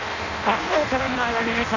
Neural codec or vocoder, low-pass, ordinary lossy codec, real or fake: codec, 16 kHz in and 24 kHz out, 0.6 kbps, FireRedTTS-2 codec; 7.2 kHz; none; fake